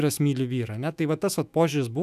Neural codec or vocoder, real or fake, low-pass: autoencoder, 48 kHz, 128 numbers a frame, DAC-VAE, trained on Japanese speech; fake; 14.4 kHz